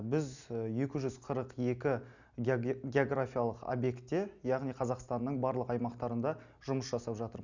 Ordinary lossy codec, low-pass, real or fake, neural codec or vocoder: none; 7.2 kHz; real; none